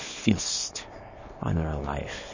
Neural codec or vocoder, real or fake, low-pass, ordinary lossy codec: codec, 24 kHz, 0.9 kbps, WavTokenizer, small release; fake; 7.2 kHz; MP3, 32 kbps